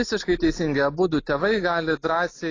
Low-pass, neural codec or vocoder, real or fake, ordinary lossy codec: 7.2 kHz; none; real; AAC, 32 kbps